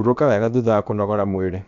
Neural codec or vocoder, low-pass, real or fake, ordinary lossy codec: codec, 16 kHz, about 1 kbps, DyCAST, with the encoder's durations; 7.2 kHz; fake; none